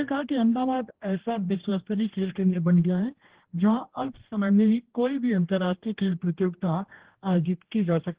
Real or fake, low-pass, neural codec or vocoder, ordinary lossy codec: fake; 3.6 kHz; codec, 16 kHz, 1 kbps, X-Codec, HuBERT features, trained on general audio; Opus, 16 kbps